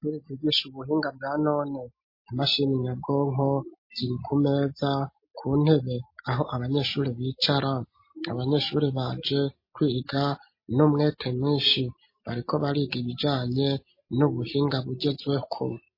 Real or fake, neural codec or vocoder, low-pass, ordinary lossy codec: real; none; 5.4 kHz; MP3, 24 kbps